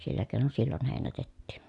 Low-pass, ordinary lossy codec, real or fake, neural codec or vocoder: 10.8 kHz; none; real; none